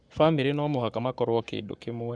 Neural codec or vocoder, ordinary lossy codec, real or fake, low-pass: codec, 44.1 kHz, 7.8 kbps, Pupu-Codec; none; fake; 9.9 kHz